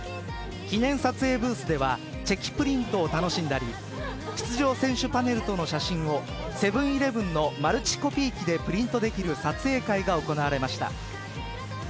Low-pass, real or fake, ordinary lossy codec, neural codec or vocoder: none; real; none; none